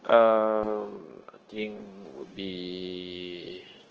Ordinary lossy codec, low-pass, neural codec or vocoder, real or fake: Opus, 16 kbps; 7.2 kHz; codec, 16 kHz, 0.9 kbps, LongCat-Audio-Codec; fake